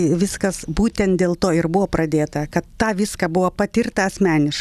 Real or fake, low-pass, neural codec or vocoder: real; 14.4 kHz; none